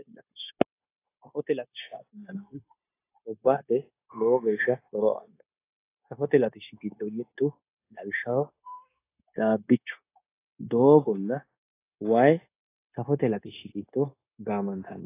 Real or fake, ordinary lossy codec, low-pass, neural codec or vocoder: fake; AAC, 24 kbps; 3.6 kHz; codec, 16 kHz, 0.9 kbps, LongCat-Audio-Codec